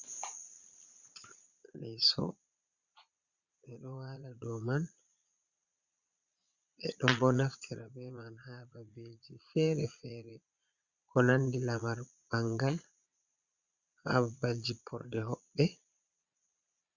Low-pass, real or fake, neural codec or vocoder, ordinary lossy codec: 7.2 kHz; fake; vocoder, 22.05 kHz, 80 mel bands, Vocos; Opus, 64 kbps